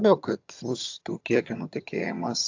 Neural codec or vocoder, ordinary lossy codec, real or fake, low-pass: vocoder, 22.05 kHz, 80 mel bands, HiFi-GAN; AAC, 48 kbps; fake; 7.2 kHz